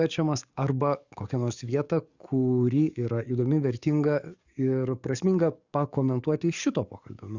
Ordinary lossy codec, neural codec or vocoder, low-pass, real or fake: Opus, 64 kbps; codec, 44.1 kHz, 7.8 kbps, DAC; 7.2 kHz; fake